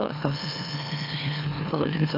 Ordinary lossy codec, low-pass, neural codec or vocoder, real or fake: AAC, 48 kbps; 5.4 kHz; autoencoder, 44.1 kHz, a latent of 192 numbers a frame, MeloTTS; fake